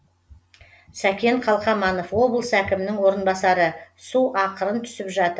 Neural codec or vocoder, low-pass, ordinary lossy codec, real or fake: none; none; none; real